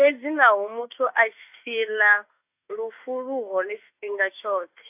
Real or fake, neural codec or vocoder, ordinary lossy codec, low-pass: fake; autoencoder, 48 kHz, 32 numbers a frame, DAC-VAE, trained on Japanese speech; AAC, 32 kbps; 3.6 kHz